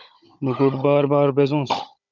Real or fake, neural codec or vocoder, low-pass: fake; codec, 16 kHz, 16 kbps, FunCodec, trained on Chinese and English, 50 frames a second; 7.2 kHz